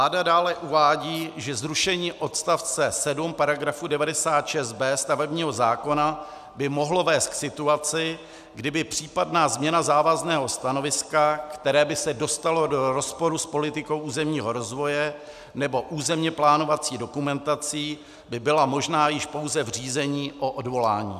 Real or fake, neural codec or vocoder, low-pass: real; none; 14.4 kHz